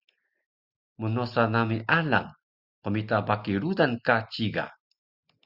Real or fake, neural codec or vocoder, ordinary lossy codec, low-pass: real; none; Opus, 64 kbps; 5.4 kHz